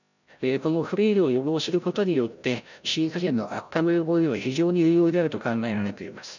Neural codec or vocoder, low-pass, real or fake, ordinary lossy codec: codec, 16 kHz, 0.5 kbps, FreqCodec, larger model; 7.2 kHz; fake; none